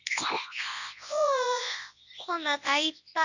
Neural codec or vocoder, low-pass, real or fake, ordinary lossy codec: codec, 24 kHz, 0.9 kbps, WavTokenizer, large speech release; 7.2 kHz; fake; none